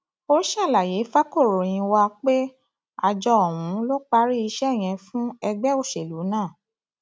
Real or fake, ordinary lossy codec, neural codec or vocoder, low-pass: real; none; none; none